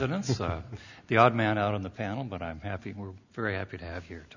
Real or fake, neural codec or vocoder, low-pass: real; none; 7.2 kHz